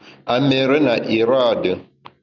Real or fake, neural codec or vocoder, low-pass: real; none; 7.2 kHz